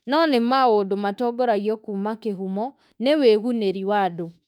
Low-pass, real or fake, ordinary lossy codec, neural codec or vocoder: 19.8 kHz; fake; none; autoencoder, 48 kHz, 32 numbers a frame, DAC-VAE, trained on Japanese speech